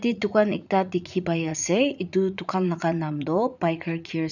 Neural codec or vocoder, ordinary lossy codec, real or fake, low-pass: vocoder, 44.1 kHz, 80 mel bands, Vocos; none; fake; 7.2 kHz